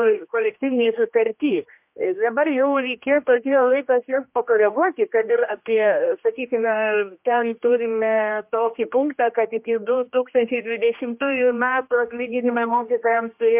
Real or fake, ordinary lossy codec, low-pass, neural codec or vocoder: fake; MP3, 32 kbps; 3.6 kHz; codec, 16 kHz, 1 kbps, X-Codec, HuBERT features, trained on general audio